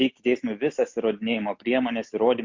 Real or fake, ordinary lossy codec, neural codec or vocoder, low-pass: real; MP3, 48 kbps; none; 7.2 kHz